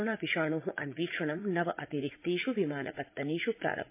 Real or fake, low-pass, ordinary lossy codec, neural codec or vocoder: fake; 3.6 kHz; MP3, 24 kbps; vocoder, 44.1 kHz, 80 mel bands, Vocos